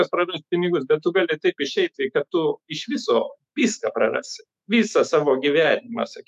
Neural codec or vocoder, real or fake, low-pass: autoencoder, 48 kHz, 128 numbers a frame, DAC-VAE, trained on Japanese speech; fake; 14.4 kHz